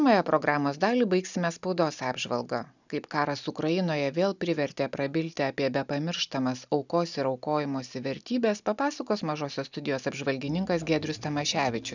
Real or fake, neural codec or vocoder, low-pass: real; none; 7.2 kHz